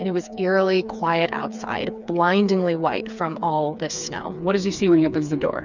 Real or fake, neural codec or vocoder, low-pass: fake; codec, 16 kHz, 4 kbps, FreqCodec, smaller model; 7.2 kHz